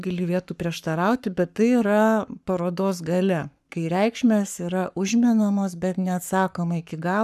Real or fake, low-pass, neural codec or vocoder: fake; 14.4 kHz; codec, 44.1 kHz, 7.8 kbps, Pupu-Codec